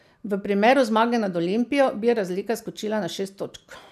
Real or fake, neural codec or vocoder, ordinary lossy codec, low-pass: real; none; none; 14.4 kHz